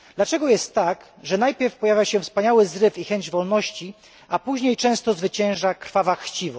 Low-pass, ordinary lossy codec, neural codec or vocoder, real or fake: none; none; none; real